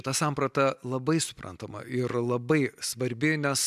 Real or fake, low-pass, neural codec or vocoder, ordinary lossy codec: real; 14.4 kHz; none; MP3, 96 kbps